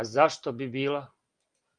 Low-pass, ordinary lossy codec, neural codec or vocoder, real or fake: 9.9 kHz; Opus, 32 kbps; none; real